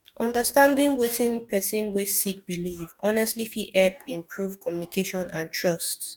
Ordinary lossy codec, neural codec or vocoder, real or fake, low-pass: none; codec, 44.1 kHz, 2.6 kbps, DAC; fake; 19.8 kHz